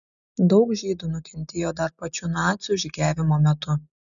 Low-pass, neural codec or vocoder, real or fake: 7.2 kHz; none; real